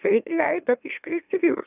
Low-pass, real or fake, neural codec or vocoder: 3.6 kHz; fake; autoencoder, 44.1 kHz, a latent of 192 numbers a frame, MeloTTS